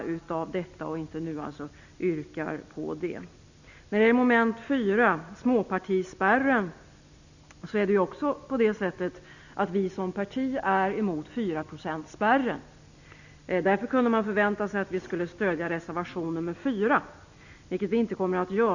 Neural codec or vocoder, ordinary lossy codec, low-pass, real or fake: none; none; 7.2 kHz; real